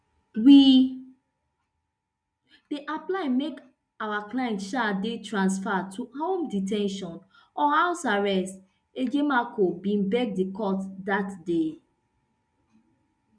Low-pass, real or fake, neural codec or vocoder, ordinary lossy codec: 9.9 kHz; real; none; none